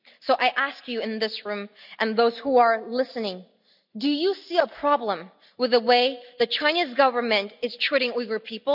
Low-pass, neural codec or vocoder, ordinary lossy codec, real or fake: 5.4 kHz; none; none; real